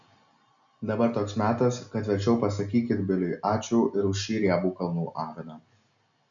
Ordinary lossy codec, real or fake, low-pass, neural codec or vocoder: MP3, 96 kbps; real; 7.2 kHz; none